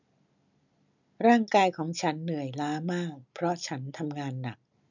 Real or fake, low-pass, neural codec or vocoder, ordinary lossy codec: real; 7.2 kHz; none; none